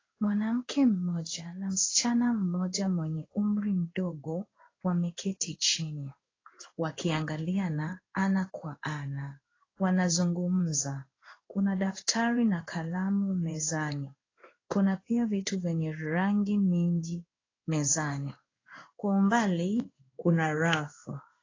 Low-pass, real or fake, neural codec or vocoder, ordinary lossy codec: 7.2 kHz; fake; codec, 16 kHz in and 24 kHz out, 1 kbps, XY-Tokenizer; AAC, 32 kbps